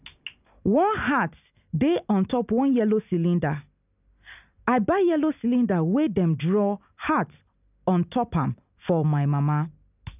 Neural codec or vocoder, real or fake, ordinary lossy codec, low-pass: none; real; none; 3.6 kHz